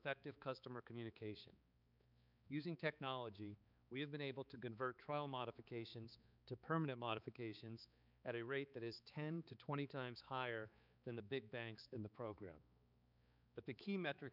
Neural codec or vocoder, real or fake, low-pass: codec, 16 kHz, 4 kbps, X-Codec, HuBERT features, trained on balanced general audio; fake; 5.4 kHz